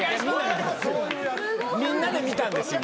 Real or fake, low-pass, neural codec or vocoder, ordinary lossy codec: real; none; none; none